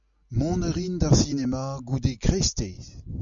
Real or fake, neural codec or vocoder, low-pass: real; none; 7.2 kHz